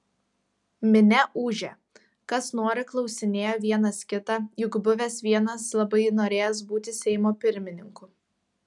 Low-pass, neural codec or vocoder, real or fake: 10.8 kHz; none; real